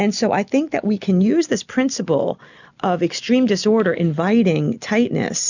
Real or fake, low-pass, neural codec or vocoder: real; 7.2 kHz; none